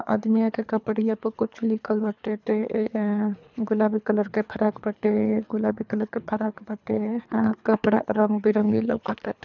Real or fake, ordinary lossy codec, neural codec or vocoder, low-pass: fake; none; codec, 24 kHz, 3 kbps, HILCodec; 7.2 kHz